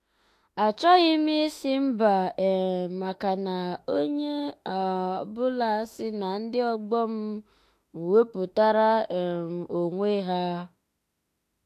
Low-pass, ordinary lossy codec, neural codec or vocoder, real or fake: 14.4 kHz; AAC, 64 kbps; autoencoder, 48 kHz, 32 numbers a frame, DAC-VAE, trained on Japanese speech; fake